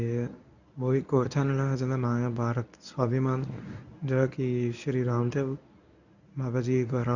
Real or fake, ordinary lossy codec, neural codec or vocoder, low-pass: fake; none; codec, 24 kHz, 0.9 kbps, WavTokenizer, medium speech release version 1; 7.2 kHz